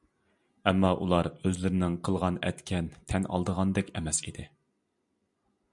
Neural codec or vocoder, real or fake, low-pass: none; real; 10.8 kHz